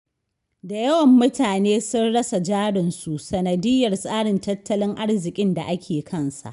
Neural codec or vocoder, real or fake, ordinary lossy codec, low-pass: none; real; none; 10.8 kHz